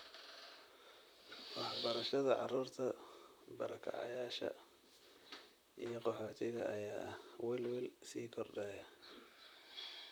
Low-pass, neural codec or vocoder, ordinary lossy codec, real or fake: none; vocoder, 44.1 kHz, 128 mel bands, Pupu-Vocoder; none; fake